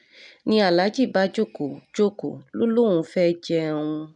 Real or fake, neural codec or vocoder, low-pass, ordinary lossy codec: real; none; 10.8 kHz; none